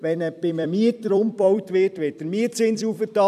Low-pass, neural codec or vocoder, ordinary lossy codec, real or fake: 14.4 kHz; vocoder, 44.1 kHz, 128 mel bands every 256 samples, BigVGAN v2; none; fake